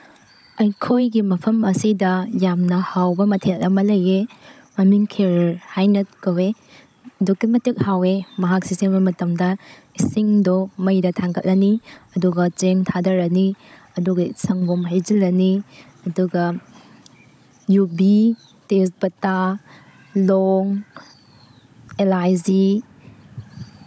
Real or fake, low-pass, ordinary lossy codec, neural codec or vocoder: fake; none; none; codec, 16 kHz, 16 kbps, FunCodec, trained on Chinese and English, 50 frames a second